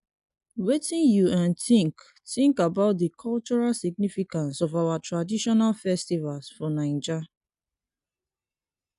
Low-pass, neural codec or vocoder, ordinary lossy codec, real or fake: 10.8 kHz; none; none; real